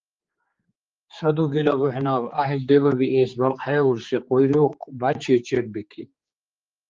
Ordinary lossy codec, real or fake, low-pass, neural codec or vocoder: Opus, 32 kbps; fake; 7.2 kHz; codec, 16 kHz, 4 kbps, X-Codec, HuBERT features, trained on general audio